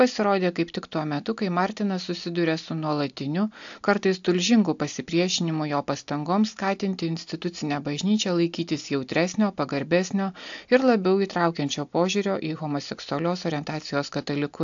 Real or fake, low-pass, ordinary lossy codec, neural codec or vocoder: real; 7.2 kHz; AAC, 64 kbps; none